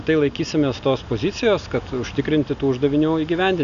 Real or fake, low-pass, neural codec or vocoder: real; 7.2 kHz; none